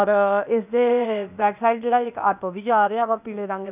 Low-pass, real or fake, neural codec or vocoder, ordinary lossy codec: 3.6 kHz; fake; codec, 16 kHz, 0.8 kbps, ZipCodec; none